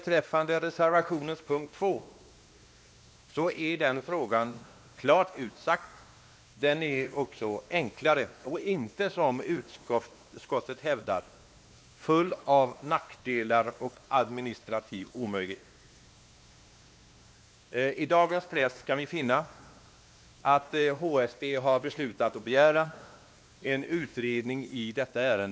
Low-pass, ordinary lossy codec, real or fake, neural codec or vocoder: none; none; fake; codec, 16 kHz, 2 kbps, X-Codec, WavLM features, trained on Multilingual LibriSpeech